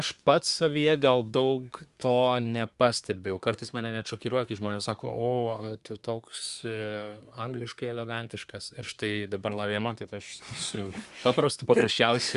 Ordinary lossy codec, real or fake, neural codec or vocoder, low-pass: Opus, 64 kbps; fake; codec, 24 kHz, 1 kbps, SNAC; 10.8 kHz